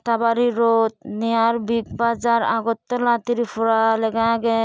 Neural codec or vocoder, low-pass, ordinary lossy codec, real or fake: none; none; none; real